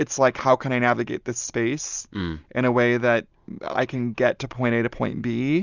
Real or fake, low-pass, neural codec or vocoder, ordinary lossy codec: real; 7.2 kHz; none; Opus, 64 kbps